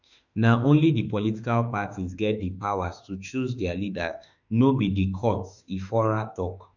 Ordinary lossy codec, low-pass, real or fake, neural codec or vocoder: none; 7.2 kHz; fake; autoencoder, 48 kHz, 32 numbers a frame, DAC-VAE, trained on Japanese speech